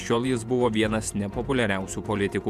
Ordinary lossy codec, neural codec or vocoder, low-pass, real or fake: MP3, 96 kbps; vocoder, 48 kHz, 128 mel bands, Vocos; 14.4 kHz; fake